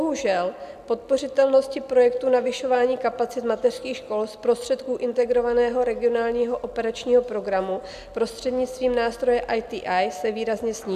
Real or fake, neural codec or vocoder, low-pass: real; none; 14.4 kHz